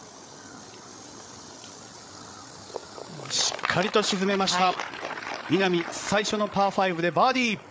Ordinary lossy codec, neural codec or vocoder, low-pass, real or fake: none; codec, 16 kHz, 8 kbps, FreqCodec, larger model; none; fake